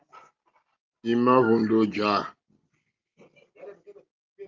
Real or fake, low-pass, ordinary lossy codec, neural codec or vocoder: real; 7.2 kHz; Opus, 24 kbps; none